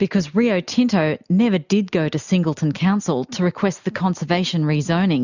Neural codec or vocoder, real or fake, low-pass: none; real; 7.2 kHz